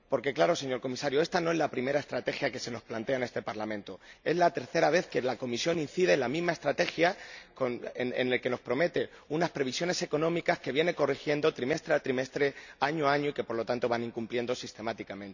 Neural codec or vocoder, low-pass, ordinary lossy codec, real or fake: none; 7.2 kHz; none; real